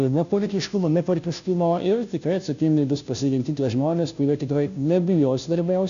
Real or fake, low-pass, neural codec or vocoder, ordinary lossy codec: fake; 7.2 kHz; codec, 16 kHz, 0.5 kbps, FunCodec, trained on Chinese and English, 25 frames a second; AAC, 96 kbps